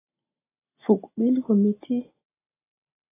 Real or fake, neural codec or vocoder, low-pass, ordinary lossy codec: real; none; 3.6 kHz; AAC, 16 kbps